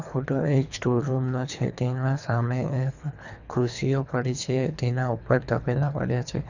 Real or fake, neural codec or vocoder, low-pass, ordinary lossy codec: fake; codec, 24 kHz, 3 kbps, HILCodec; 7.2 kHz; none